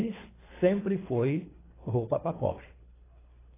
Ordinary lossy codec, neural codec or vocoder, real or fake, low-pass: AAC, 16 kbps; codec, 24 kHz, 3 kbps, HILCodec; fake; 3.6 kHz